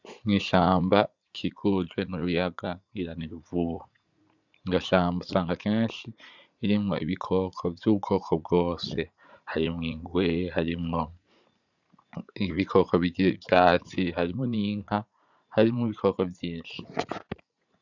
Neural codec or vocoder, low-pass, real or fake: vocoder, 44.1 kHz, 80 mel bands, Vocos; 7.2 kHz; fake